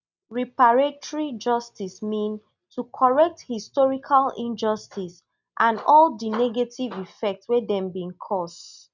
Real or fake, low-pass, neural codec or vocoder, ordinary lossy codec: real; 7.2 kHz; none; none